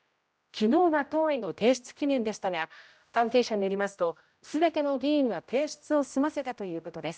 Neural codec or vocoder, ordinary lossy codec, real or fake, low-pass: codec, 16 kHz, 0.5 kbps, X-Codec, HuBERT features, trained on general audio; none; fake; none